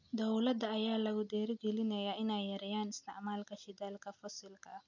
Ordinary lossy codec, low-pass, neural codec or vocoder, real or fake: none; 7.2 kHz; none; real